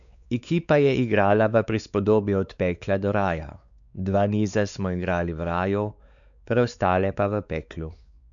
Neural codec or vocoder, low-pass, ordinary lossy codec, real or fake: codec, 16 kHz, 4 kbps, X-Codec, WavLM features, trained on Multilingual LibriSpeech; 7.2 kHz; none; fake